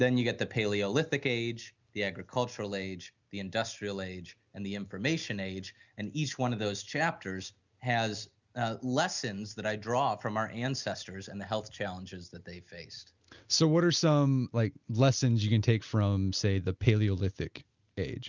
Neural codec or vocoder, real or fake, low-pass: none; real; 7.2 kHz